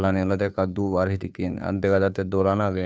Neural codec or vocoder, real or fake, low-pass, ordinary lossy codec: codec, 16 kHz, 2 kbps, FunCodec, trained on Chinese and English, 25 frames a second; fake; none; none